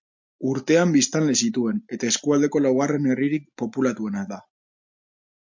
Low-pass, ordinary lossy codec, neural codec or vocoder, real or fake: 7.2 kHz; MP3, 48 kbps; none; real